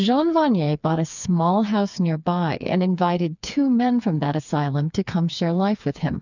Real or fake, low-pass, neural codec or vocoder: fake; 7.2 kHz; codec, 16 kHz, 4 kbps, FreqCodec, smaller model